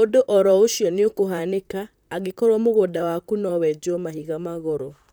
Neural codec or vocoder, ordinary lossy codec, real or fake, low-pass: vocoder, 44.1 kHz, 128 mel bands, Pupu-Vocoder; none; fake; none